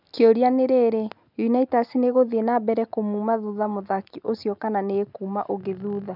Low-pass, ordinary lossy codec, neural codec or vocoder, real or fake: 5.4 kHz; none; none; real